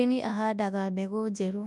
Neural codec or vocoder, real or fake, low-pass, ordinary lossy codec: codec, 24 kHz, 0.9 kbps, WavTokenizer, large speech release; fake; none; none